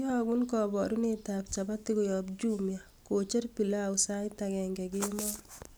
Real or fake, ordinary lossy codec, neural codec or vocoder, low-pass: real; none; none; none